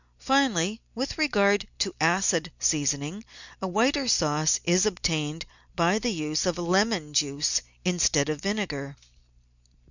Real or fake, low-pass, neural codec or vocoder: real; 7.2 kHz; none